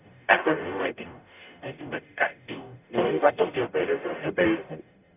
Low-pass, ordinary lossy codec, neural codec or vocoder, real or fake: 3.6 kHz; none; codec, 44.1 kHz, 0.9 kbps, DAC; fake